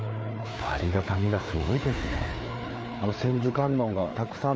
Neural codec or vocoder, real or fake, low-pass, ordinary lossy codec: codec, 16 kHz, 4 kbps, FreqCodec, larger model; fake; none; none